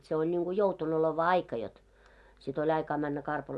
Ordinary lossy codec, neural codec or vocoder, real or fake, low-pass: none; none; real; none